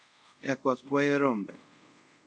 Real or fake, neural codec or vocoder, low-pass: fake; codec, 24 kHz, 0.5 kbps, DualCodec; 9.9 kHz